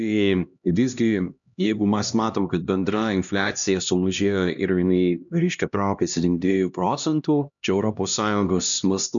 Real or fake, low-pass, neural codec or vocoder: fake; 7.2 kHz; codec, 16 kHz, 1 kbps, X-Codec, HuBERT features, trained on LibriSpeech